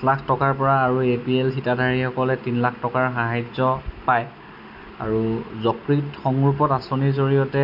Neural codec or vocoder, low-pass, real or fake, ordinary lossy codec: none; 5.4 kHz; real; none